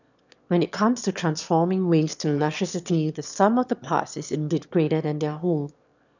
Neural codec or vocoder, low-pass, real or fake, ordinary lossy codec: autoencoder, 22.05 kHz, a latent of 192 numbers a frame, VITS, trained on one speaker; 7.2 kHz; fake; none